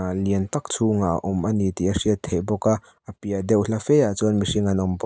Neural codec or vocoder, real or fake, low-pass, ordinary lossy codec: none; real; none; none